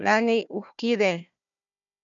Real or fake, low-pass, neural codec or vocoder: fake; 7.2 kHz; codec, 16 kHz, 1 kbps, FunCodec, trained on Chinese and English, 50 frames a second